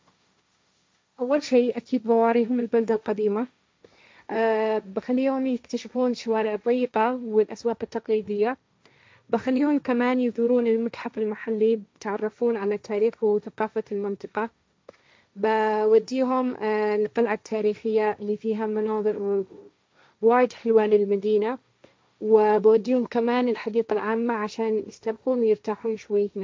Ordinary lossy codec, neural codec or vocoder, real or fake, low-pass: none; codec, 16 kHz, 1.1 kbps, Voila-Tokenizer; fake; none